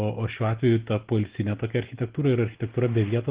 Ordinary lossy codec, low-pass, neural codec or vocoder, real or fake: Opus, 16 kbps; 3.6 kHz; none; real